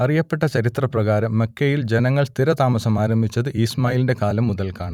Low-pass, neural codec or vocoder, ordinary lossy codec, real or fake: 19.8 kHz; vocoder, 44.1 kHz, 128 mel bands every 256 samples, BigVGAN v2; none; fake